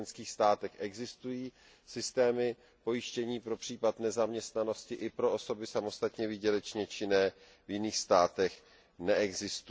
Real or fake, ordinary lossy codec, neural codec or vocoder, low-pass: real; none; none; none